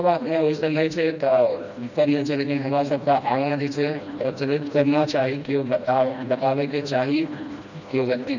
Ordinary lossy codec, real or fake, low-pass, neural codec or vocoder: none; fake; 7.2 kHz; codec, 16 kHz, 1 kbps, FreqCodec, smaller model